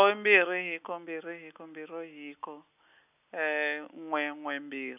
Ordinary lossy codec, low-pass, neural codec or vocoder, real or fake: none; 3.6 kHz; none; real